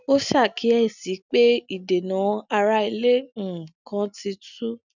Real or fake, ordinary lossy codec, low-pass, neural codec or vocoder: real; none; 7.2 kHz; none